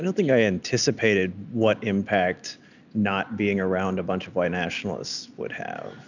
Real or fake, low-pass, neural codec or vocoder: real; 7.2 kHz; none